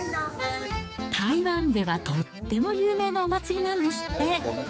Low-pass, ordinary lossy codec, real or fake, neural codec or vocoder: none; none; fake; codec, 16 kHz, 4 kbps, X-Codec, HuBERT features, trained on general audio